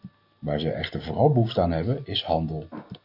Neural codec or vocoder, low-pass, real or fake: none; 5.4 kHz; real